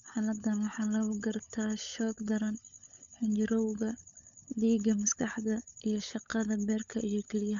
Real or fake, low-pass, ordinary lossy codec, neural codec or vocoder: fake; 7.2 kHz; none; codec, 16 kHz, 16 kbps, FunCodec, trained on LibriTTS, 50 frames a second